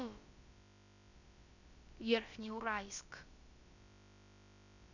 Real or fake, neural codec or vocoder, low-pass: fake; codec, 16 kHz, about 1 kbps, DyCAST, with the encoder's durations; 7.2 kHz